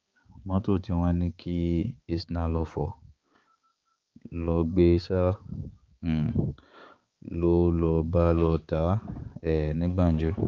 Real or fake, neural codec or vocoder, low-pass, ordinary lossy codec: fake; codec, 16 kHz, 4 kbps, X-Codec, HuBERT features, trained on balanced general audio; 7.2 kHz; Opus, 24 kbps